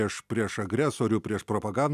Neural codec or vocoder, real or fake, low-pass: none; real; 14.4 kHz